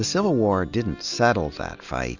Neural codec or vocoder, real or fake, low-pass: none; real; 7.2 kHz